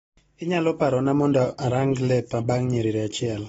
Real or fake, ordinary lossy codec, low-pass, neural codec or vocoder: real; AAC, 24 kbps; 19.8 kHz; none